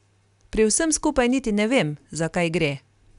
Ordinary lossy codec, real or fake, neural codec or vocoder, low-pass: none; real; none; 10.8 kHz